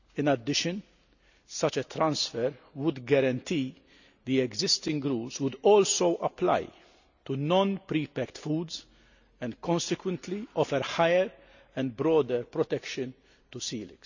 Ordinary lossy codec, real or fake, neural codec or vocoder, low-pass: none; real; none; 7.2 kHz